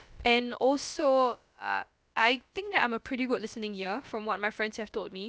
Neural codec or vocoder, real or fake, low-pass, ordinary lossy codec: codec, 16 kHz, about 1 kbps, DyCAST, with the encoder's durations; fake; none; none